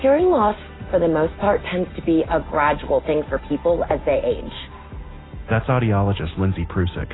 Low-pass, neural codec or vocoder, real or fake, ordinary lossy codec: 7.2 kHz; none; real; AAC, 16 kbps